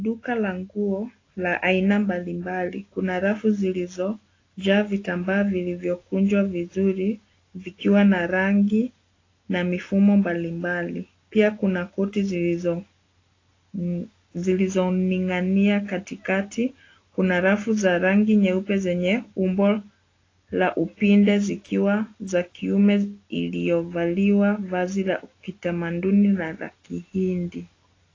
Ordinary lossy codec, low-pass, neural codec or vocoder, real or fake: AAC, 32 kbps; 7.2 kHz; none; real